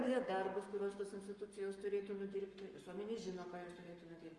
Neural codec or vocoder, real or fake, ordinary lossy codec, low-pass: codec, 44.1 kHz, 7.8 kbps, Pupu-Codec; fake; Opus, 32 kbps; 10.8 kHz